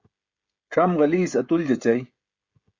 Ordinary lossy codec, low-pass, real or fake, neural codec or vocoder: Opus, 64 kbps; 7.2 kHz; fake; codec, 16 kHz, 16 kbps, FreqCodec, smaller model